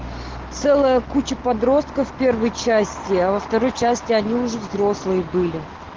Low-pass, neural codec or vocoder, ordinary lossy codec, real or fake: 7.2 kHz; none; Opus, 16 kbps; real